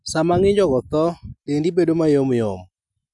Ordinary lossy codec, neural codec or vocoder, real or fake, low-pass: none; none; real; 10.8 kHz